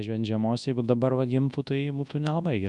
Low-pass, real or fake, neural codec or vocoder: 10.8 kHz; fake; codec, 24 kHz, 0.9 kbps, WavTokenizer, large speech release